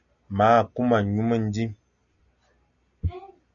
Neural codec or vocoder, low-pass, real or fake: none; 7.2 kHz; real